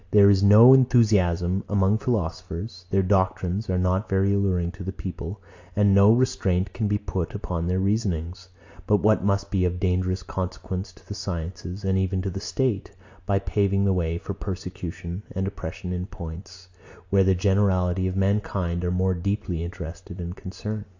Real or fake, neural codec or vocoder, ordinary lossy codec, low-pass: real; none; AAC, 48 kbps; 7.2 kHz